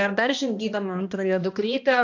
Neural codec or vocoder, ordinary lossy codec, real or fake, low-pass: codec, 16 kHz, 1 kbps, X-Codec, HuBERT features, trained on balanced general audio; AAC, 48 kbps; fake; 7.2 kHz